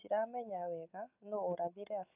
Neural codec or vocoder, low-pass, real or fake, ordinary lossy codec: none; 3.6 kHz; real; none